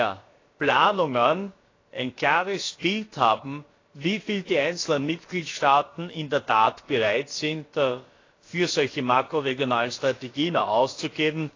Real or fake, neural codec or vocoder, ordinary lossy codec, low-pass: fake; codec, 16 kHz, about 1 kbps, DyCAST, with the encoder's durations; AAC, 32 kbps; 7.2 kHz